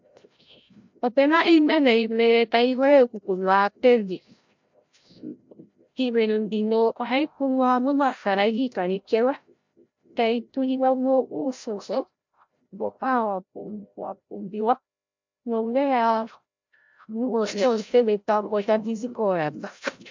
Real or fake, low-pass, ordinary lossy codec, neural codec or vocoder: fake; 7.2 kHz; MP3, 64 kbps; codec, 16 kHz, 0.5 kbps, FreqCodec, larger model